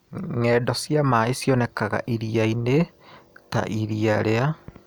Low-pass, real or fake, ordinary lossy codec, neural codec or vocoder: none; real; none; none